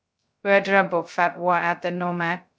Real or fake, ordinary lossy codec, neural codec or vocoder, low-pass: fake; none; codec, 16 kHz, 0.2 kbps, FocalCodec; none